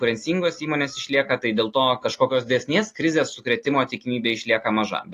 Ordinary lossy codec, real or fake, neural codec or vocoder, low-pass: AAC, 48 kbps; real; none; 14.4 kHz